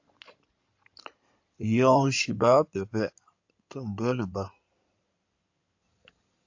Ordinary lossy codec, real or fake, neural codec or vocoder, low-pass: AAC, 48 kbps; fake; codec, 16 kHz in and 24 kHz out, 2.2 kbps, FireRedTTS-2 codec; 7.2 kHz